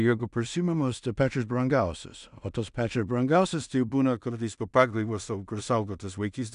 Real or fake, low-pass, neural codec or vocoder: fake; 10.8 kHz; codec, 16 kHz in and 24 kHz out, 0.4 kbps, LongCat-Audio-Codec, two codebook decoder